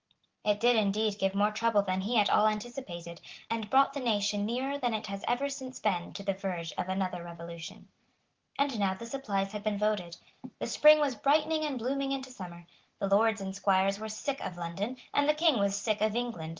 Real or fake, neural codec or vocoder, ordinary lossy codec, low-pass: real; none; Opus, 16 kbps; 7.2 kHz